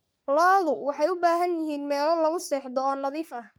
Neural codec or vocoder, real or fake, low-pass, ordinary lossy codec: codec, 44.1 kHz, 3.4 kbps, Pupu-Codec; fake; none; none